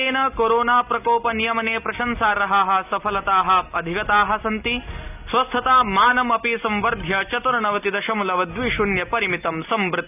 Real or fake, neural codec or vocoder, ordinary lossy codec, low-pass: real; none; none; 3.6 kHz